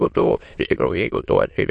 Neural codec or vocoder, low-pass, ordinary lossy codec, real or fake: autoencoder, 22.05 kHz, a latent of 192 numbers a frame, VITS, trained on many speakers; 9.9 kHz; MP3, 48 kbps; fake